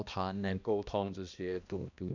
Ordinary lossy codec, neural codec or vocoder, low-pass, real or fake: none; codec, 16 kHz, 1 kbps, X-Codec, HuBERT features, trained on balanced general audio; 7.2 kHz; fake